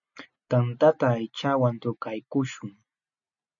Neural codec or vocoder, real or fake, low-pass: none; real; 7.2 kHz